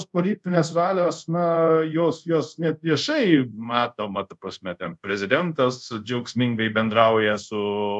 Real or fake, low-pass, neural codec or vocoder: fake; 10.8 kHz; codec, 24 kHz, 0.5 kbps, DualCodec